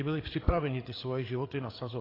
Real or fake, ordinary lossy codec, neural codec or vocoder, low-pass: fake; AAC, 24 kbps; codec, 16 kHz, 8 kbps, FreqCodec, larger model; 5.4 kHz